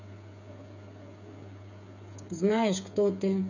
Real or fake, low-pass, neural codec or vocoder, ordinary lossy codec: fake; 7.2 kHz; codec, 16 kHz, 8 kbps, FreqCodec, smaller model; none